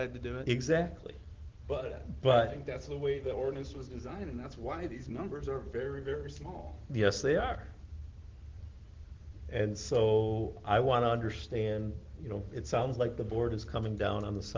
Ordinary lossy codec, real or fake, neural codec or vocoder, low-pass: Opus, 16 kbps; real; none; 7.2 kHz